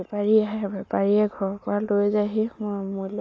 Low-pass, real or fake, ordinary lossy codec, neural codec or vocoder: none; real; none; none